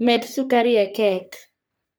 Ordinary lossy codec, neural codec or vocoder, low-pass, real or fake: none; codec, 44.1 kHz, 3.4 kbps, Pupu-Codec; none; fake